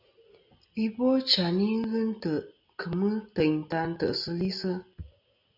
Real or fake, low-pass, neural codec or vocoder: real; 5.4 kHz; none